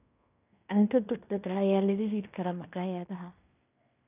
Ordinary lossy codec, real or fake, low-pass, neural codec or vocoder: none; fake; 3.6 kHz; codec, 16 kHz in and 24 kHz out, 0.9 kbps, LongCat-Audio-Codec, fine tuned four codebook decoder